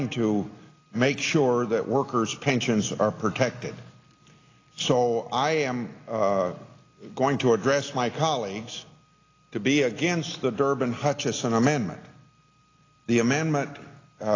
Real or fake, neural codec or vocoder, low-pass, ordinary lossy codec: real; none; 7.2 kHz; AAC, 32 kbps